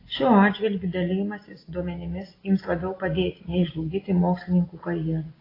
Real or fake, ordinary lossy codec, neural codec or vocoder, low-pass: real; AAC, 24 kbps; none; 5.4 kHz